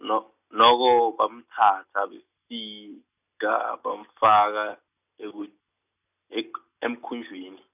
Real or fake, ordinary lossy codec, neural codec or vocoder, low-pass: real; none; none; 3.6 kHz